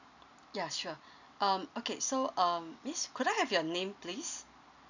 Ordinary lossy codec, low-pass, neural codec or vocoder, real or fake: none; 7.2 kHz; none; real